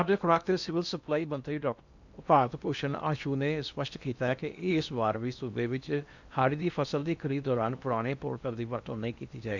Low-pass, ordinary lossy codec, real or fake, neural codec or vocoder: 7.2 kHz; none; fake; codec, 16 kHz in and 24 kHz out, 0.8 kbps, FocalCodec, streaming, 65536 codes